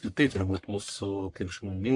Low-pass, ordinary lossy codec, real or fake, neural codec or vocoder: 10.8 kHz; MP3, 64 kbps; fake; codec, 44.1 kHz, 1.7 kbps, Pupu-Codec